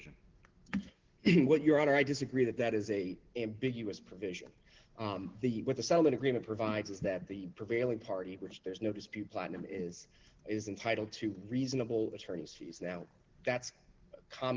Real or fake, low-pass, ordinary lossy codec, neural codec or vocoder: real; 7.2 kHz; Opus, 16 kbps; none